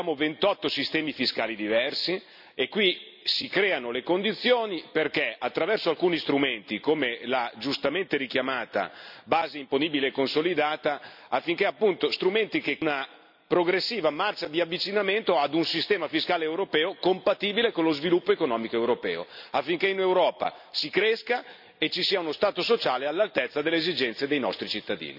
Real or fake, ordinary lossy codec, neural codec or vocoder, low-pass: real; none; none; 5.4 kHz